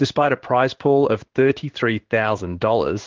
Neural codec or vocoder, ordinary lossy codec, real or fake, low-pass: codec, 16 kHz in and 24 kHz out, 1 kbps, XY-Tokenizer; Opus, 16 kbps; fake; 7.2 kHz